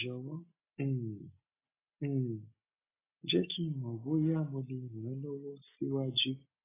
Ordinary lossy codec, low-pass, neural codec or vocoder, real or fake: AAC, 16 kbps; 3.6 kHz; none; real